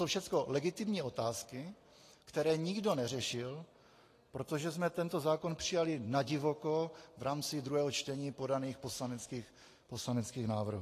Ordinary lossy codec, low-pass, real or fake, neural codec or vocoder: AAC, 48 kbps; 14.4 kHz; real; none